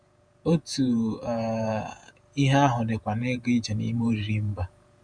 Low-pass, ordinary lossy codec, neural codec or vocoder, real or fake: 9.9 kHz; none; vocoder, 48 kHz, 128 mel bands, Vocos; fake